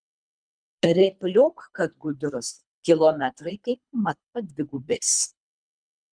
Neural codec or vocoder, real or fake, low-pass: codec, 24 kHz, 3 kbps, HILCodec; fake; 9.9 kHz